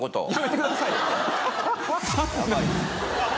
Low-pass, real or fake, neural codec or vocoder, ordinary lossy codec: none; real; none; none